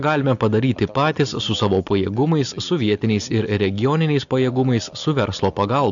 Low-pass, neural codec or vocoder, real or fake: 7.2 kHz; none; real